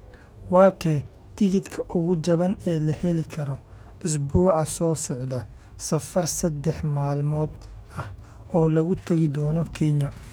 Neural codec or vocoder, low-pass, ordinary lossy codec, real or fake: codec, 44.1 kHz, 2.6 kbps, DAC; none; none; fake